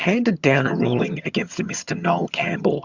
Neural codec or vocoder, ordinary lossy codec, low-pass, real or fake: vocoder, 22.05 kHz, 80 mel bands, HiFi-GAN; Opus, 64 kbps; 7.2 kHz; fake